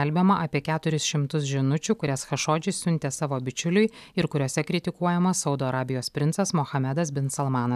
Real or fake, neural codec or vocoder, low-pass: real; none; 14.4 kHz